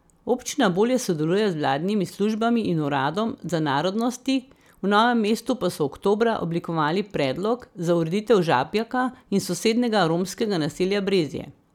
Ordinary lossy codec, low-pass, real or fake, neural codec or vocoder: none; 19.8 kHz; real; none